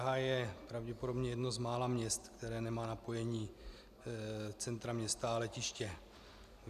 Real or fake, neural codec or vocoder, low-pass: real; none; 14.4 kHz